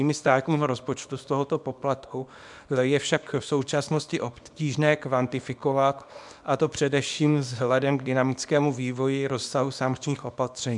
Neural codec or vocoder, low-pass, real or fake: codec, 24 kHz, 0.9 kbps, WavTokenizer, small release; 10.8 kHz; fake